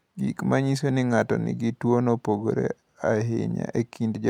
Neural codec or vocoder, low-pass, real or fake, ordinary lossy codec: none; 19.8 kHz; real; MP3, 96 kbps